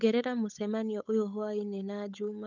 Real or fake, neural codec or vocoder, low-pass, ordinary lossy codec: fake; codec, 16 kHz, 8 kbps, FunCodec, trained on Chinese and English, 25 frames a second; 7.2 kHz; none